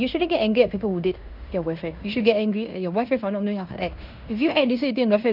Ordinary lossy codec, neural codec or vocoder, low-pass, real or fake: none; codec, 16 kHz in and 24 kHz out, 0.9 kbps, LongCat-Audio-Codec, fine tuned four codebook decoder; 5.4 kHz; fake